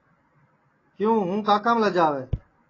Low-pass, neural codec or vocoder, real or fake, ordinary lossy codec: 7.2 kHz; none; real; AAC, 32 kbps